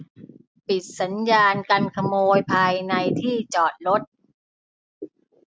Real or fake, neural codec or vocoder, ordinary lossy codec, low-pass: real; none; none; none